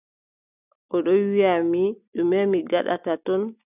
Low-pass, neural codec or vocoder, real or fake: 3.6 kHz; none; real